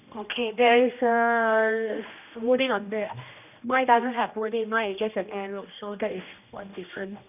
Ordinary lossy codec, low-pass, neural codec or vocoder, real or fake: none; 3.6 kHz; codec, 16 kHz, 1 kbps, X-Codec, HuBERT features, trained on general audio; fake